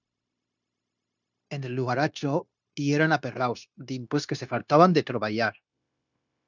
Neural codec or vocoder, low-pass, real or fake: codec, 16 kHz, 0.9 kbps, LongCat-Audio-Codec; 7.2 kHz; fake